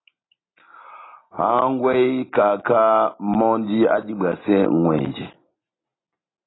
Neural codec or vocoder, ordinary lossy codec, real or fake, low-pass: none; AAC, 16 kbps; real; 7.2 kHz